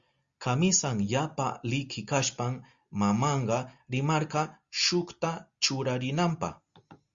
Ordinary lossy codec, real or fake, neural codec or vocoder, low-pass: Opus, 64 kbps; real; none; 7.2 kHz